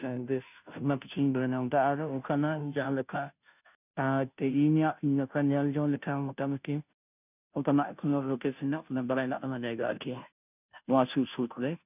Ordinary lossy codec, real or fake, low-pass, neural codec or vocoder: none; fake; 3.6 kHz; codec, 16 kHz, 0.5 kbps, FunCodec, trained on Chinese and English, 25 frames a second